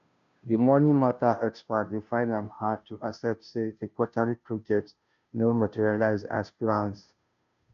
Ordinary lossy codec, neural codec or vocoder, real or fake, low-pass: none; codec, 16 kHz, 0.5 kbps, FunCodec, trained on Chinese and English, 25 frames a second; fake; 7.2 kHz